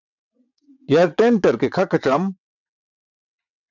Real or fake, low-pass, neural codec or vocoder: fake; 7.2 kHz; vocoder, 22.05 kHz, 80 mel bands, WaveNeXt